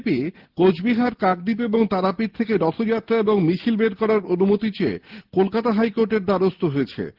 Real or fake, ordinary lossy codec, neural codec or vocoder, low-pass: real; Opus, 16 kbps; none; 5.4 kHz